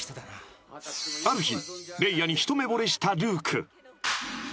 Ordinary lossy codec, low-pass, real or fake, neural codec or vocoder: none; none; real; none